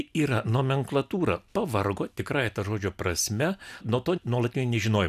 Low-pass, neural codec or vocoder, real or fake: 14.4 kHz; none; real